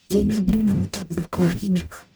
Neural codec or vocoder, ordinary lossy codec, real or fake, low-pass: codec, 44.1 kHz, 0.9 kbps, DAC; none; fake; none